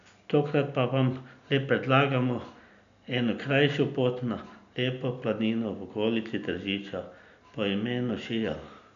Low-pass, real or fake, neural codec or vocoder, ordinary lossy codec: 7.2 kHz; real; none; MP3, 96 kbps